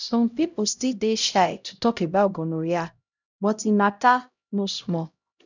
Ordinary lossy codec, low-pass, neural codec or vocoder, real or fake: none; 7.2 kHz; codec, 16 kHz, 0.5 kbps, X-Codec, HuBERT features, trained on LibriSpeech; fake